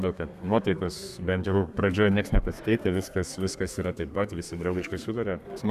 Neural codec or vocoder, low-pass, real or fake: codec, 32 kHz, 1.9 kbps, SNAC; 14.4 kHz; fake